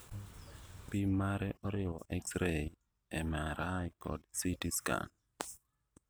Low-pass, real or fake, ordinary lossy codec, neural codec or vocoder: none; real; none; none